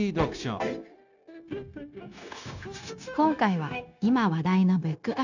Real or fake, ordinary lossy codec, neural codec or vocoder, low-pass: fake; none; codec, 16 kHz, 0.9 kbps, LongCat-Audio-Codec; 7.2 kHz